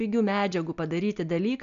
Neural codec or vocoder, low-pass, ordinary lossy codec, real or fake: none; 7.2 kHz; MP3, 96 kbps; real